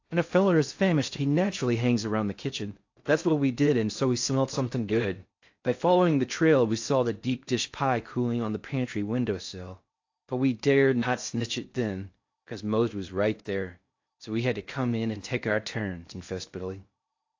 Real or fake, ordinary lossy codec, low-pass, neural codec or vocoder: fake; AAC, 48 kbps; 7.2 kHz; codec, 16 kHz in and 24 kHz out, 0.6 kbps, FocalCodec, streaming, 2048 codes